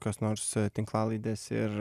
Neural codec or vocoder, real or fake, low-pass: none; real; 14.4 kHz